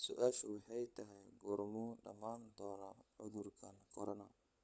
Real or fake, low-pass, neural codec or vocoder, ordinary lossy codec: fake; none; codec, 16 kHz, 16 kbps, FunCodec, trained on LibriTTS, 50 frames a second; none